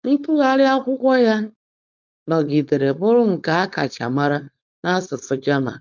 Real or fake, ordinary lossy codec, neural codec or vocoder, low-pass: fake; none; codec, 16 kHz, 4.8 kbps, FACodec; 7.2 kHz